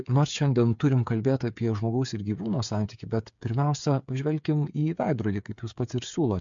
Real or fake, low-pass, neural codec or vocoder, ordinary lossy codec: fake; 7.2 kHz; codec, 16 kHz, 8 kbps, FreqCodec, smaller model; MP3, 64 kbps